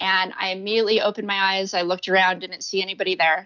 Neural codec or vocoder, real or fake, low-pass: none; real; 7.2 kHz